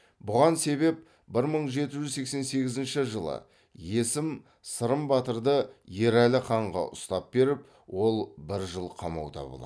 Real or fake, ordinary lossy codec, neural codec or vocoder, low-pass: real; none; none; none